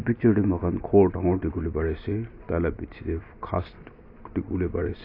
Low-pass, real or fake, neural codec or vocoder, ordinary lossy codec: 5.4 kHz; real; none; AAC, 32 kbps